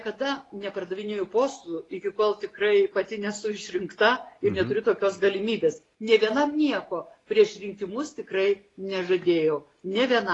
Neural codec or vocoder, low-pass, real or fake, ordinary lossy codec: none; 10.8 kHz; real; AAC, 32 kbps